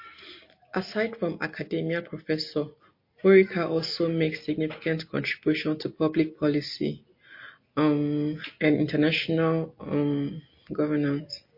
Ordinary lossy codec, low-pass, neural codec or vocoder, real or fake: MP3, 32 kbps; 5.4 kHz; none; real